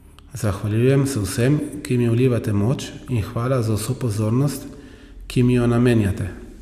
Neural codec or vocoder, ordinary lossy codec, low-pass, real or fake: none; none; 14.4 kHz; real